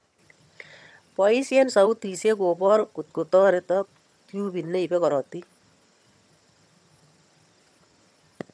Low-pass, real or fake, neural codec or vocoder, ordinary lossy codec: none; fake; vocoder, 22.05 kHz, 80 mel bands, HiFi-GAN; none